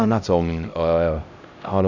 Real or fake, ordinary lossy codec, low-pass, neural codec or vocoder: fake; none; 7.2 kHz; codec, 16 kHz, 0.5 kbps, X-Codec, HuBERT features, trained on LibriSpeech